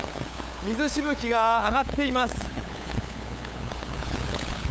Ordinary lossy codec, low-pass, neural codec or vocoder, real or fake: none; none; codec, 16 kHz, 8 kbps, FunCodec, trained on LibriTTS, 25 frames a second; fake